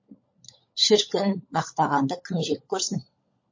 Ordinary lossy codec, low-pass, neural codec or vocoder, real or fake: MP3, 32 kbps; 7.2 kHz; codec, 16 kHz, 16 kbps, FunCodec, trained on LibriTTS, 50 frames a second; fake